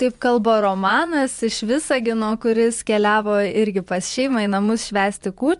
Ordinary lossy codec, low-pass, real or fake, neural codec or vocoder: MP3, 64 kbps; 10.8 kHz; fake; vocoder, 24 kHz, 100 mel bands, Vocos